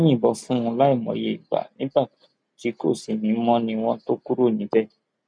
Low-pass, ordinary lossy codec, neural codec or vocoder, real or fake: 9.9 kHz; none; none; real